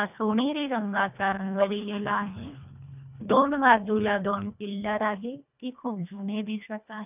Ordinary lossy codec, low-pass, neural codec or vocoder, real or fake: none; 3.6 kHz; codec, 24 kHz, 1.5 kbps, HILCodec; fake